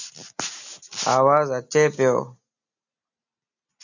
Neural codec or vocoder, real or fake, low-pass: none; real; 7.2 kHz